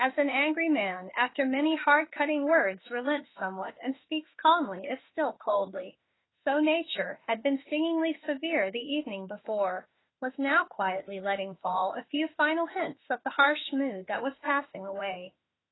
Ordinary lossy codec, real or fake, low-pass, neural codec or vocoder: AAC, 16 kbps; fake; 7.2 kHz; autoencoder, 48 kHz, 32 numbers a frame, DAC-VAE, trained on Japanese speech